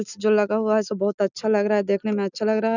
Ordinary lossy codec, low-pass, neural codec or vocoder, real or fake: none; 7.2 kHz; none; real